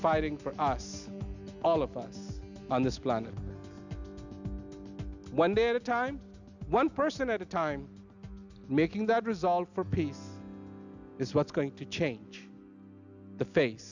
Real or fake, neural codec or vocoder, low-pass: real; none; 7.2 kHz